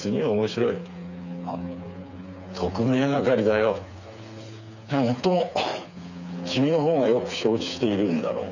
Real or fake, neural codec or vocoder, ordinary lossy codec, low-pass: fake; codec, 16 kHz, 4 kbps, FreqCodec, smaller model; none; 7.2 kHz